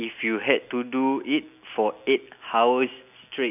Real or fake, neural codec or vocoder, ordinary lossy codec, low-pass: real; none; none; 3.6 kHz